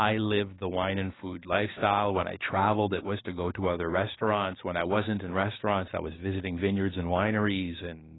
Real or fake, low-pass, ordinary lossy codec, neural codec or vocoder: fake; 7.2 kHz; AAC, 16 kbps; codec, 16 kHz, 6 kbps, DAC